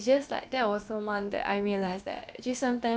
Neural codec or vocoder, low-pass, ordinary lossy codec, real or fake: codec, 16 kHz, about 1 kbps, DyCAST, with the encoder's durations; none; none; fake